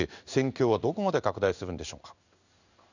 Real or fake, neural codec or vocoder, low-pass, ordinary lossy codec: fake; codec, 16 kHz in and 24 kHz out, 1 kbps, XY-Tokenizer; 7.2 kHz; none